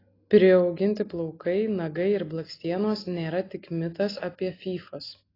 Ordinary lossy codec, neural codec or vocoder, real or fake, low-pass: AAC, 24 kbps; none; real; 5.4 kHz